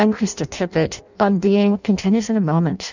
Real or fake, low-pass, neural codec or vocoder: fake; 7.2 kHz; codec, 16 kHz in and 24 kHz out, 0.6 kbps, FireRedTTS-2 codec